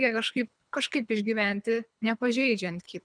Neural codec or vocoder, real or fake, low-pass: codec, 24 kHz, 3 kbps, HILCodec; fake; 9.9 kHz